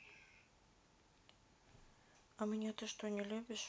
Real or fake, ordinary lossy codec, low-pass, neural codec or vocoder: real; none; none; none